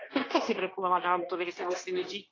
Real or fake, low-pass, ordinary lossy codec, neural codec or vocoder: fake; 7.2 kHz; AAC, 32 kbps; codec, 16 kHz, 0.9 kbps, LongCat-Audio-Codec